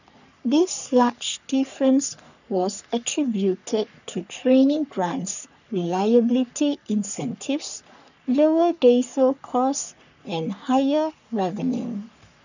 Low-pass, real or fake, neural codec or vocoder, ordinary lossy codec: 7.2 kHz; fake; codec, 44.1 kHz, 3.4 kbps, Pupu-Codec; none